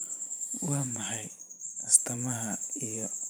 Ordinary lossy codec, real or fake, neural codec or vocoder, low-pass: none; fake; vocoder, 44.1 kHz, 128 mel bands every 512 samples, BigVGAN v2; none